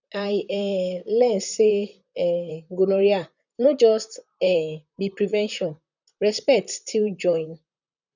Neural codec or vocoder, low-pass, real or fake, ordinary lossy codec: vocoder, 44.1 kHz, 128 mel bands, Pupu-Vocoder; 7.2 kHz; fake; none